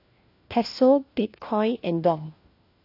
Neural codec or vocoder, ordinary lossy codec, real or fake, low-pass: codec, 16 kHz, 1 kbps, FunCodec, trained on LibriTTS, 50 frames a second; AAC, 32 kbps; fake; 5.4 kHz